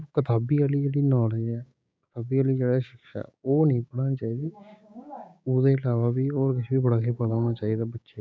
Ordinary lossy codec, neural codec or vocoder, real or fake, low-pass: none; codec, 16 kHz, 6 kbps, DAC; fake; none